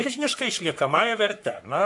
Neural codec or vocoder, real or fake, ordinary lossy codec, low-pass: codec, 44.1 kHz, 3.4 kbps, Pupu-Codec; fake; MP3, 96 kbps; 10.8 kHz